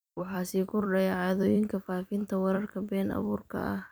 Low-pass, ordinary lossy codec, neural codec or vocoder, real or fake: none; none; vocoder, 44.1 kHz, 128 mel bands every 256 samples, BigVGAN v2; fake